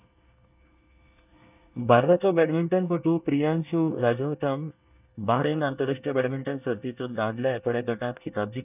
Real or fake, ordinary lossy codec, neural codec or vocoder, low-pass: fake; none; codec, 24 kHz, 1 kbps, SNAC; 3.6 kHz